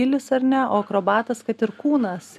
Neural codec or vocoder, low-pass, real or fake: none; 14.4 kHz; real